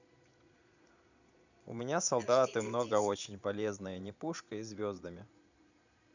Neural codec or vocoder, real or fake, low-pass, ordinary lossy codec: vocoder, 22.05 kHz, 80 mel bands, Vocos; fake; 7.2 kHz; none